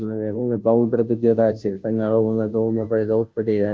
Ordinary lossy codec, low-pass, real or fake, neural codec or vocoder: none; none; fake; codec, 16 kHz, 0.5 kbps, FunCodec, trained on Chinese and English, 25 frames a second